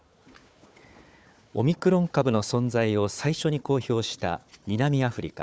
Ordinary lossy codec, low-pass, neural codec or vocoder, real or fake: none; none; codec, 16 kHz, 4 kbps, FunCodec, trained on Chinese and English, 50 frames a second; fake